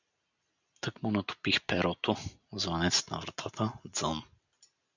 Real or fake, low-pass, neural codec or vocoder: real; 7.2 kHz; none